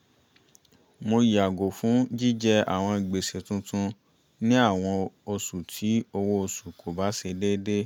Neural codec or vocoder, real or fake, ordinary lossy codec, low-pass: none; real; none; 19.8 kHz